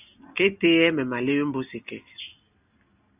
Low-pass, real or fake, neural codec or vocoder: 3.6 kHz; real; none